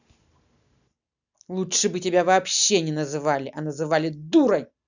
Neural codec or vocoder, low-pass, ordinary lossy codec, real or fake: none; 7.2 kHz; none; real